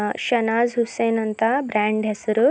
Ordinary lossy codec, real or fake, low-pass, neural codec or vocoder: none; real; none; none